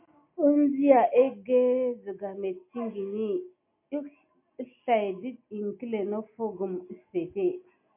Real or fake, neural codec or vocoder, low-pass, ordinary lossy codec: real; none; 3.6 kHz; MP3, 24 kbps